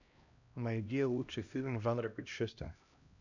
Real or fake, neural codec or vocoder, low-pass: fake; codec, 16 kHz, 1 kbps, X-Codec, HuBERT features, trained on LibriSpeech; 7.2 kHz